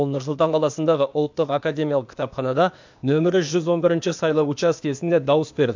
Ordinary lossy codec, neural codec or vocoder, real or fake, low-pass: MP3, 64 kbps; codec, 16 kHz, 0.8 kbps, ZipCodec; fake; 7.2 kHz